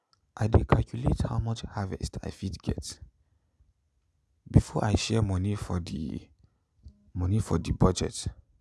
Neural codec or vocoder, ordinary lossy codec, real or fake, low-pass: none; none; real; none